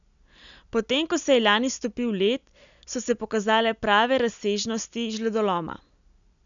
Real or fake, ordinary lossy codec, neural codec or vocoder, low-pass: real; none; none; 7.2 kHz